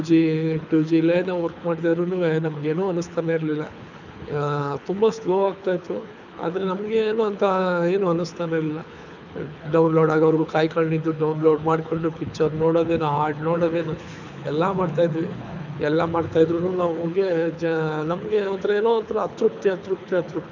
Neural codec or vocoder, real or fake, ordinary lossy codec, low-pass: codec, 24 kHz, 6 kbps, HILCodec; fake; none; 7.2 kHz